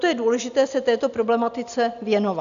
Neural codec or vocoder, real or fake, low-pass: none; real; 7.2 kHz